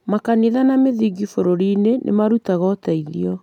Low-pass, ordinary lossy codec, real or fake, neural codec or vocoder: 19.8 kHz; none; real; none